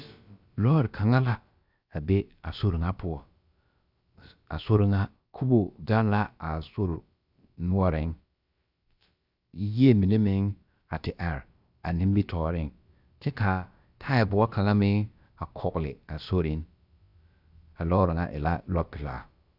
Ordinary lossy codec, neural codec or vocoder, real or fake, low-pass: Opus, 64 kbps; codec, 16 kHz, about 1 kbps, DyCAST, with the encoder's durations; fake; 5.4 kHz